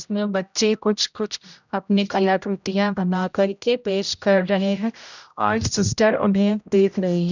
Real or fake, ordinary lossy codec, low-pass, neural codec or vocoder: fake; none; 7.2 kHz; codec, 16 kHz, 0.5 kbps, X-Codec, HuBERT features, trained on general audio